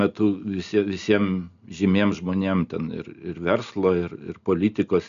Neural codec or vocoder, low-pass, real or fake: none; 7.2 kHz; real